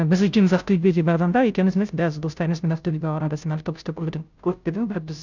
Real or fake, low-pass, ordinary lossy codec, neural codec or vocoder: fake; 7.2 kHz; none; codec, 16 kHz, 0.5 kbps, FunCodec, trained on Chinese and English, 25 frames a second